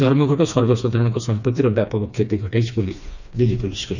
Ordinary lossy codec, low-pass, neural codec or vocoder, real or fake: none; 7.2 kHz; codec, 16 kHz, 2 kbps, FreqCodec, smaller model; fake